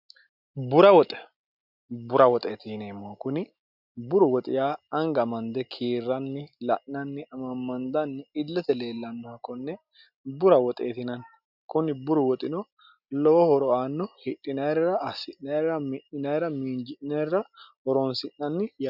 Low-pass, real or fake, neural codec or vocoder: 5.4 kHz; real; none